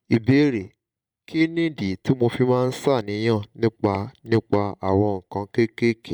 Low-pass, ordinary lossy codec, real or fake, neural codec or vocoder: 19.8 kHz; MP3, 96 kbps; fake; vocoder, 44.1 kHz, 128 mel bands every 512 samples, BigVGAN v2